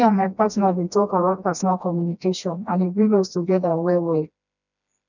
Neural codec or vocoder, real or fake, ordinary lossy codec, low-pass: codec, 16 kHz, 1 kbps, FreqCodec, smaller model; fake; none; 7.2 kHz